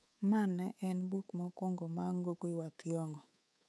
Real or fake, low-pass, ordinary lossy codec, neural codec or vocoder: fake; none; none; codec, 24 kHz, 3.1 kbps, DualCodec